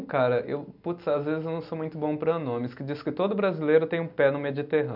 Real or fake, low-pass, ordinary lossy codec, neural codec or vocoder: real; 5.4 kHz; none; none